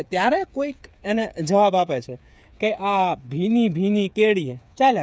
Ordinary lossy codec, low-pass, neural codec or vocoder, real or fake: none; none; codec, 16 kHz, 8 kbps, FreqCodec, smaller model; fake